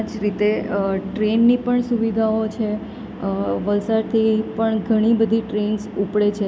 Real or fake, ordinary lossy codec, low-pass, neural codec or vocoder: real; none; none; none